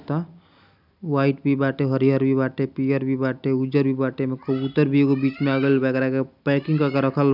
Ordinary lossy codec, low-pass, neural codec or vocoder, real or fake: none; 5.4 kHz; none; real